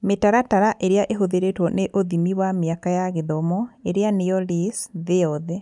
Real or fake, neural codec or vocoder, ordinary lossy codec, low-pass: real; none; none; 10.8 kHz